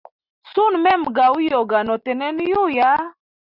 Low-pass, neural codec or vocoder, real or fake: 5.4 kHz; none; real